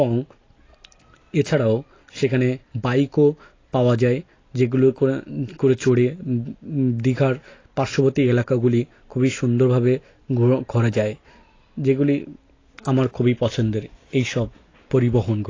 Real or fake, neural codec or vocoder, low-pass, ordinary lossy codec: real; none; 7.2 kHz; AAC, 32 kbps